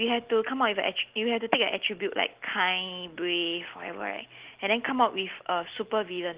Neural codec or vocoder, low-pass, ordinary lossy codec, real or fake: none; 3.6 kHz; Opus, 16 kbps; real